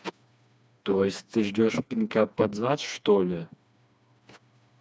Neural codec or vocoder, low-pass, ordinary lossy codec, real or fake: codec, 16 kHz, 2 kbps, FreqCodec, smaller model; none; none; fake